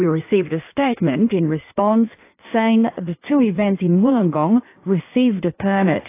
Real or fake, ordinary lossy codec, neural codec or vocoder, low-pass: fake; AAC, 24 kbps; codec, 16 kHz in and 24 kHz out, 1.1 kbps, FireRedTTS-2 codec; 3.6 kHz